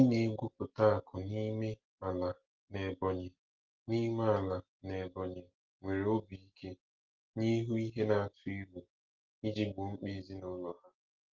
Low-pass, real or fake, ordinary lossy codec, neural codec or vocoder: 7.2 kHz; real; Opus, 16 kbps; none